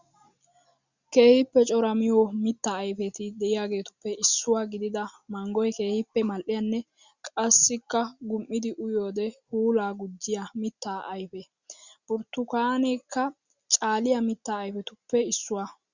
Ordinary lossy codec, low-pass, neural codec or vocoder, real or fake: Opus, 64 kbps; 7.2 kHz; none; real